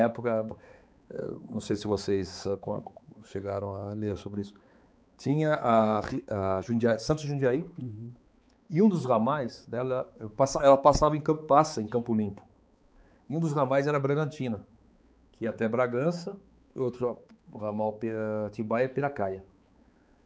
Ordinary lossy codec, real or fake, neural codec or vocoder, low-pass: none; fake; codec, 16 kHz, 4 kbps, X-Codec, HuBERT features, trained on balanced general audio; none